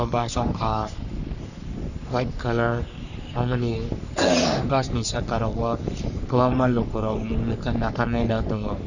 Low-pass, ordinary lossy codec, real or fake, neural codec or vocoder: 7.2 kHz; none; fake; codec, 44.1 kHz, 3.4 kbps, Pupu-Codec